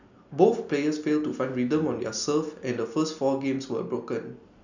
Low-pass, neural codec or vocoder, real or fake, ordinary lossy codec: 7.2 kHz; none; real; none